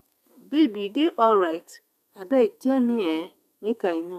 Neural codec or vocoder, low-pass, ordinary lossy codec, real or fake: codec, 32 kHz, 1.9 kbps, SNAC; 14.4 kHz; none; fake